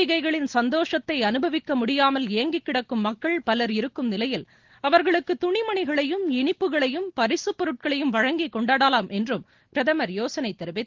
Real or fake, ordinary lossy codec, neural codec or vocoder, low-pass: real; Opus, 16 kbps; none; 7.2 kHz